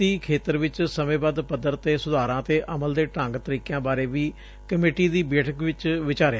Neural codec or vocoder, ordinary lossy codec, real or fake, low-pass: none; none; real; none